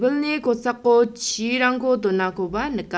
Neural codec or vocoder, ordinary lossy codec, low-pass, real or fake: none; none; none; real